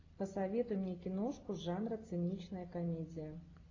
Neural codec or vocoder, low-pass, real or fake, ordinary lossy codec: none; 7.2 kHz; real; AAC, 32 kbps